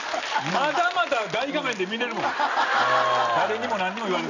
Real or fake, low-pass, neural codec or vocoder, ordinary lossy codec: real; 7.2 kHz; none; none